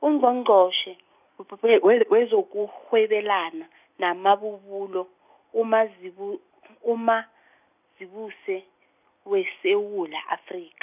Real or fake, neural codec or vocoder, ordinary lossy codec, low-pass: real; none; none; 3.6 kHz